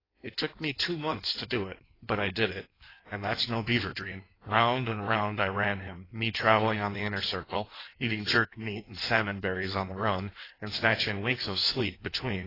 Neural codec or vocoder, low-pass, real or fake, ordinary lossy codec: codec, 16 kHz in and 24 kHz out, 1.1 kbps, FireRedTTS-2 codec; 5.4 kHz; fake; AAC, 24 kbps